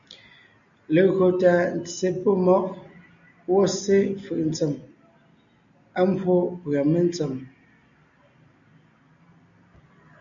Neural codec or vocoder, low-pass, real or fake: none; 7.2 kHz; real